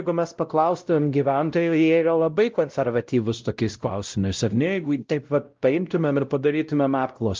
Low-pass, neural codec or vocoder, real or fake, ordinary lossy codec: 7.2 kHz; codec, 16 kHz, 0.5 kbps, X-Codec, WavLM features, trained on Multilingual LibriSpeech; fake; Opus, 24 kbps